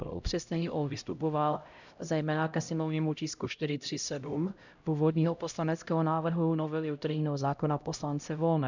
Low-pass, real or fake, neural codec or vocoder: 7.2 kHz; fake; codec, 16 kHz, 0.5 kbps, X-Codec, HuBERT features, trained on LibriSpeech